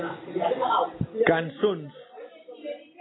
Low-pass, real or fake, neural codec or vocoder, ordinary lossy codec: 7.2 kHz; real; none; AAC, 16 kbps